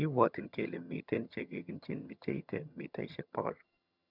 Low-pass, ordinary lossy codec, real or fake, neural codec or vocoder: 5.4 kHz; none; fake; vocoder, 22.05 kHz, 80 mel bands, HiFi-GAN